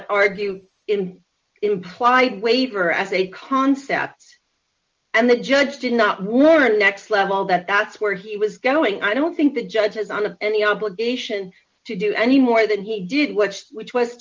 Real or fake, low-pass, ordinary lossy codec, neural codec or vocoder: real; 7.2 kHz; Opus, 24 kbps; none